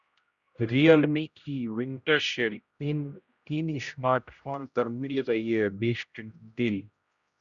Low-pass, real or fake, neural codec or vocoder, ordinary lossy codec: 7.2 kHz; fake; codec, 16 kHz, 0.5 kbps, X-Codec, HuBERT features, trained on general audio; Opus, 64 kbps